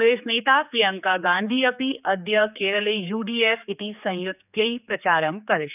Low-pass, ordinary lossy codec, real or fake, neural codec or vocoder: 3.6 kHz; none; fake; codec, 16 kHz, 4 kbps, X-Codec, HuBERT features, trained on general audio